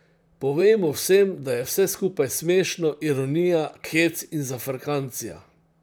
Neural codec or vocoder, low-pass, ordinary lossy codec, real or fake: vocoder, 44.1 kHz, 128 mel bands, Pupu-Vocoder; none; none; fake